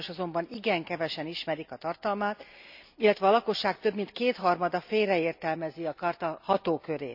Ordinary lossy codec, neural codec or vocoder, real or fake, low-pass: none; none; real; 5.4 kHz